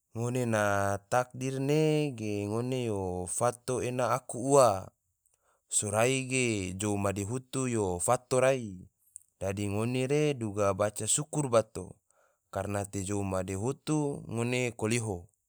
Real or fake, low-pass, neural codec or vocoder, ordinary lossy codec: real; none; none; none